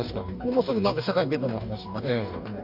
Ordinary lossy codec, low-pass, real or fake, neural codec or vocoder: none; 5.4 kHz; fake; codec, 44.1 kHz, 2.6 kbps, DAC